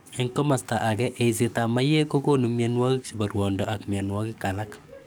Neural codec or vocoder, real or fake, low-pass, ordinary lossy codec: codec, 44.1 kHz, 7.8 kbps, DAC; fake; none; none